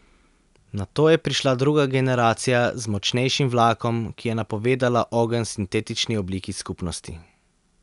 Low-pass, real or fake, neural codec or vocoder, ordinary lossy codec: 10.8 kHz; real; none; none